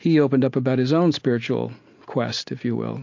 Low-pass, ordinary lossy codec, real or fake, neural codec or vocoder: 7.2 kHz; MP3, 48 kbps; real; none